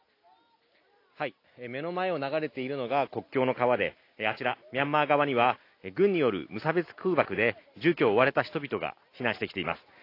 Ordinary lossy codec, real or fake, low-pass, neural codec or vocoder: AAC, 32 kbps; real; 5.4 kHz; none